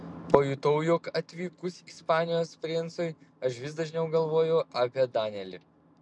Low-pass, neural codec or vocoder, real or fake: 10.8 kHz; none; real